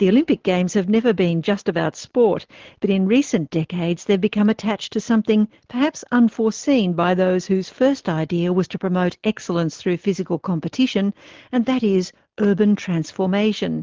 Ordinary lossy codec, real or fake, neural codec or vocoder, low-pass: Opus, 16 kbps; real; none; 7.2 kHz